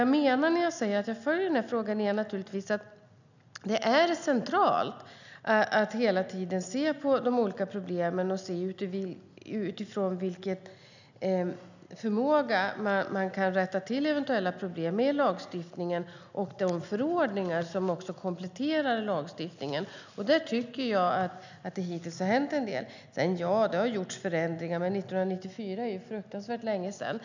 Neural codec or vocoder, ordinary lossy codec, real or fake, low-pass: none; none; real; 7.2 kHz